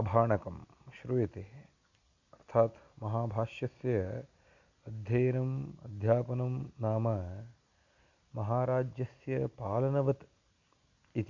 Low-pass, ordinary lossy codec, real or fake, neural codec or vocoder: 7.2 kHz; Opus, 64 kbps; real; none